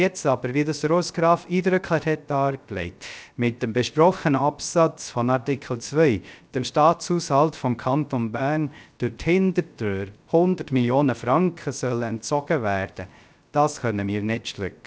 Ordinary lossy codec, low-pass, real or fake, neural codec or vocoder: none; none; fake; codec, 16 kHz, 0.3 kbps, FocalCodec